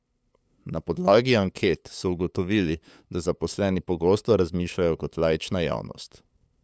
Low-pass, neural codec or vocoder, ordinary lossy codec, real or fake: none; codec, 16 kHz, 8 kbps, FunCodec, trained on LibriTTS, 25 frames a second; none; fake